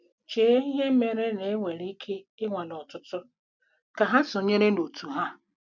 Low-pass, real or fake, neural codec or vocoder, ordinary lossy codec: 7.2 kHz; real; none; none